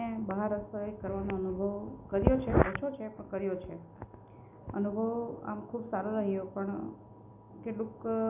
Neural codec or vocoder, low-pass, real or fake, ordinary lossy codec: none; 3.6 kHz; real; none